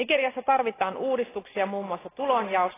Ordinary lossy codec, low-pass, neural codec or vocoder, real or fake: AAC, 16 kbps; 3.6 kHz; none; real